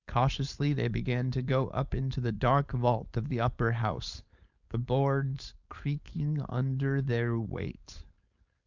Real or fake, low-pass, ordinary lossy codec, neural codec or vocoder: fake; 7.2 kHz; Opus, 64 kbps; codec, 16 kHz, 4.8 kbps, FACodec